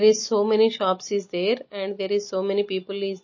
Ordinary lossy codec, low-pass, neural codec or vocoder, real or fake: MP3, 32 kbps; 7.2 kHz; none; real